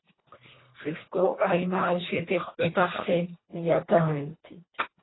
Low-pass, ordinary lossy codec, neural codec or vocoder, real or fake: 7.2 kHz; AAC, 16 kbps; codec, 24 kHz, 1.5 kbps, HILCodec; fake